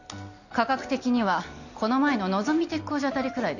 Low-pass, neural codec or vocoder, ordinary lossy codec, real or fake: 7.2 kHz; none; none; real